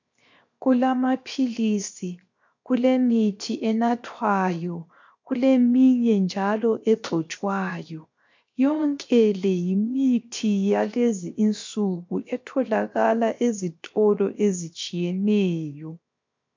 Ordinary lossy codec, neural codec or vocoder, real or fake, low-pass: MP3, 48 kbps; codec, 16 kHz, 0.7 kbps, FocalCodec; fake; 7.2 kHz